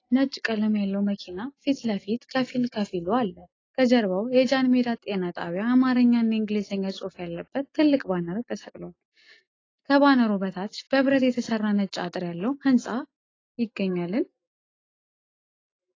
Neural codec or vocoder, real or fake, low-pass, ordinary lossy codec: none; real; 7.2 kHz; AAC, 32 kbps